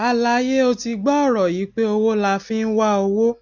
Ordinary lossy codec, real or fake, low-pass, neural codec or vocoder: none; real; 7.2 kHz; none